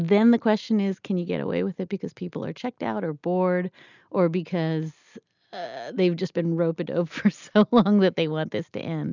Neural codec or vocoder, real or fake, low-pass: none; real; 7.2 kHz